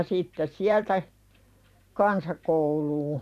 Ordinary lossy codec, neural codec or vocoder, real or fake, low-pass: none; vocoder, 44.1 kHz, 128 mel bands every 512 samples, BigVGAN v2; fake; 14.4 kHz